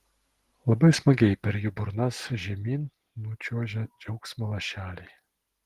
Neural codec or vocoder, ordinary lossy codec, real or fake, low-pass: none; Opus, 16 kbps; real; 14.4 kHz